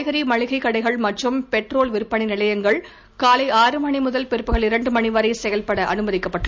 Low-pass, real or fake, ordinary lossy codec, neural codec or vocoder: none; real; none; none